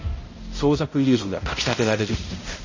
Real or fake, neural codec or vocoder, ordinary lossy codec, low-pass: fake; codec, 16 kHz, 0.5 kbps, X-Codec, HuBERT features, trained on balanced general audio; MP3, 32 kbps; 7.2 kHz